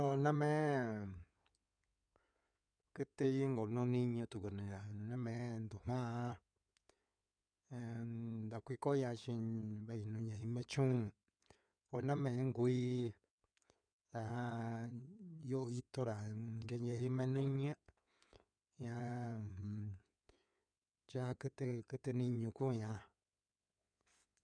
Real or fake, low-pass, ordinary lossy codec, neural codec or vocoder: fake; 9.9 kHz; none; codec, 16 kHz in and 24 kHz out, 2.2 kbps, FireRedTTS-2 codec